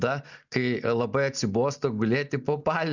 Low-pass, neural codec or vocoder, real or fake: 7.2 kHz; none; real